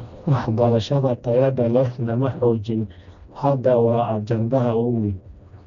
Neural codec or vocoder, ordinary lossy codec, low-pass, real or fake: codec, 16 kHz, 1 kbps, FreqCodec, smaller model; none; 7.2 kHz; fake